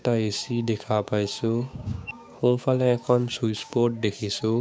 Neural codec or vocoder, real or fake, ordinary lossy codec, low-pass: codec, 16 kHz, 6 kbps, DAC; fake; none; none